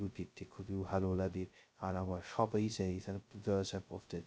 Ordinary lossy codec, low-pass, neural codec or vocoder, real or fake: none; none; codec, 16 kHz, 0.2 kbps, FocalCodec; fake